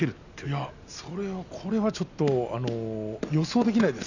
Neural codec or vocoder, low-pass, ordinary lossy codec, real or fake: none; 7.2 kHz; none; real